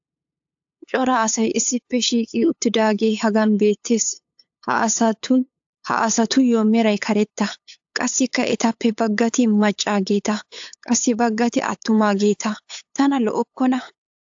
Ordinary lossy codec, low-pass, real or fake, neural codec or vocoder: AAC, 64 kbps; 7.2 kHz; fake; codec, 16 kHz, 8 kbps, FunCodec, trained on LibriTTS, 25 frames a second